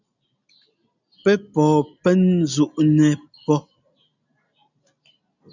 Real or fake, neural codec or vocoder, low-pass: real; none; 7.2 kHz